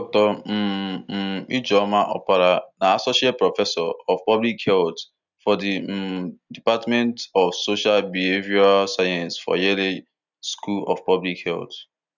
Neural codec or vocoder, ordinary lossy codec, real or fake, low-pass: none; none; real; 7.2 kHz